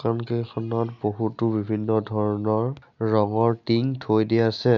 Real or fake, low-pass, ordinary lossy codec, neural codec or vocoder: real; 7.2 kHz; none; none